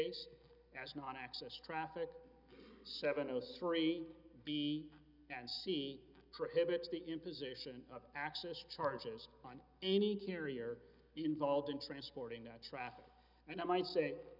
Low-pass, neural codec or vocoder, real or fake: 5.4 kHz; none; real